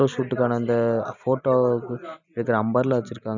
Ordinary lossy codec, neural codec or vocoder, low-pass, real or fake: none; vocoder, 44.1 kHz, 128 mel bands every 256 samples, BigVGAN v2; 7.2 kHz; fake